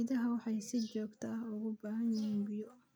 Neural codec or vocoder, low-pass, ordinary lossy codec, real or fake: none; none; none; real